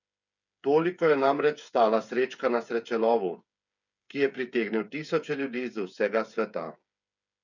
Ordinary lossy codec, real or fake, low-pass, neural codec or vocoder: none; fake; 7.2 kHz; codec, 16 kHz, 8 kbps, FreqCodec, smaller model